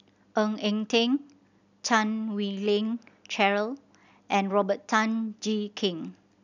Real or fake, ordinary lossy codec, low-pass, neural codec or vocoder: real; none; 7.2 kHz; none